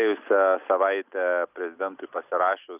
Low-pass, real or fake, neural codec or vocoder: 3.6 kHz; real; none